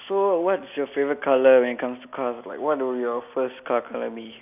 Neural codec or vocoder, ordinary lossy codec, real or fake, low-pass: none; none; real; 3.6 kHz